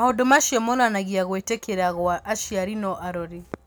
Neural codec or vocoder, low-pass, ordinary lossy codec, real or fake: none; none; none; real